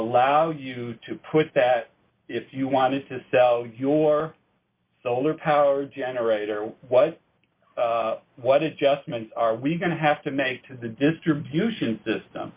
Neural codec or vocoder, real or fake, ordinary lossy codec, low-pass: none; real; Opus, 64 kbps; 3.6 kHz